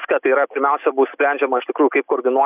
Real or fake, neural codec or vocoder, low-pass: real; none; 3.6 kHz